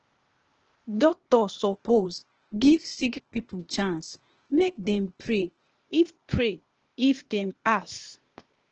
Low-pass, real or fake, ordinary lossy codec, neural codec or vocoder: 7.2 kHz; fake; Opus, 16 kbps; codec, 16 kHz, 0.8 kbps, ZipCodec